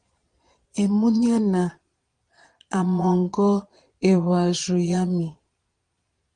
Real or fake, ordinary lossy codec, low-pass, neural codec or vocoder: fake; Opus, 24 kbps; 9.9 kHz; vocoder, 22.05 kHz, 80 mel bands, Vocos